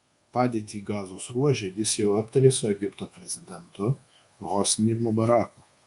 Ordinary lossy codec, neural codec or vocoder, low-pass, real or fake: Opus, 64 kbps; codec, 24 kHz, 1.2 kbps, DualCodec; 10.8 kHz; fake